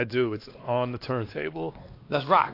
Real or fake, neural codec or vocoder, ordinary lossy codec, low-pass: fake; codec, 16 kHz, 2 kbps, X-Codec, WavLM features, trained on Multilingual LibriSpeech; AAC, 32 kbps; 5.4 kHz